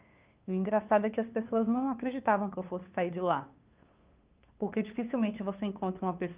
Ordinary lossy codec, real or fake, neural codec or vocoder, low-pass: Opus, 24 kbps; fake; codec, 16 kHz, 2 kbps, FunCodec, trained on LibriTTS, 25 frames a second; 3.6 kHz